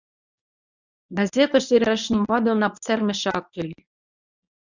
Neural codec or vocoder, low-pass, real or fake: codec, 24 kHz, 0.9 kbps, WavTokenizer, medium speech release version 1; 7.2 kHz; fake